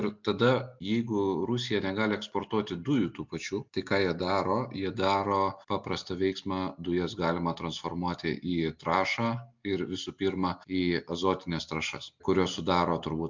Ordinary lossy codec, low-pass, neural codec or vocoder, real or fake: MP3, 64 kbps; 7.2 kHz; none; real